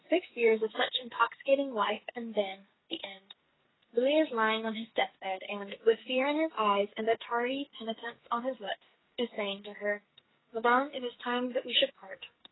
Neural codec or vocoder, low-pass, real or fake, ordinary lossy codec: codec, 44.1 kHz, 2.6 kbps, SNAC; 7.2 kHz; fake; AAC, 16 kbps